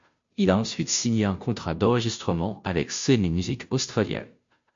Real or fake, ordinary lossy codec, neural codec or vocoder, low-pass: fake; MP3, 48 kbps; codec, 16 kHz, 0.5 kbps, FunCodec, trained on Chinese and English, 25 frames a second; 7.2 kHz